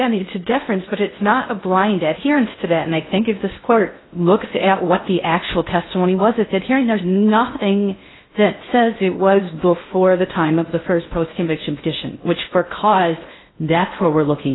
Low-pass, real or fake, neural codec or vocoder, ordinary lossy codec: 7.2 kHz; fake; codec, 16 kHz in and 24 kHz out, 0.6 kbps, FocalCodec, streaming, 2048 codes; AAC, 16 kbps